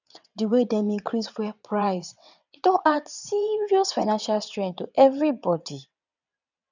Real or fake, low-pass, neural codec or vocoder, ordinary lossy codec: fake; 7.2 kHz; vocoder, 22.05 kHz, 80 mel bands, WaveNeXt; none